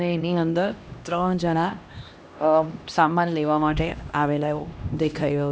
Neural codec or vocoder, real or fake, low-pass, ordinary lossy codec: codec, 16 kHz, 1 kbps, X-Codec, HuBERT features, trained on LibriSpeech; fake; none; none